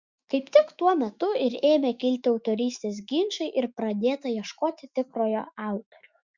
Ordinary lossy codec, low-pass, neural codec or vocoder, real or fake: Opus, 64 kbps; 7.2 kHz; autoencoder, 48 kHz, 128 numbers a frame, DAC-VAE, trained on Japanese speech; fake